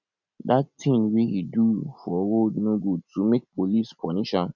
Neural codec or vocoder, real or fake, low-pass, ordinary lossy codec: none; real; 7.2 kHz; none